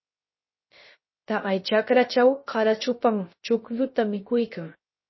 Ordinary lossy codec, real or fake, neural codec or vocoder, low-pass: MP3, 24 kbps; fake; codec, 16 kHz, 0.3 kbps, FocalCodec; 7.2 kHz